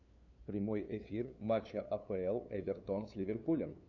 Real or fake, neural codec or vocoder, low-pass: fake; codec, 16 kHz, 2 kbps, FunCodec, trained on LibriTTS, 25 frames a second; 7.2 kHz